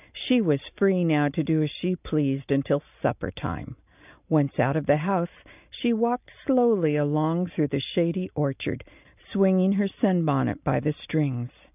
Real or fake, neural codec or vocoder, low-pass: real; none; 3.6 kHz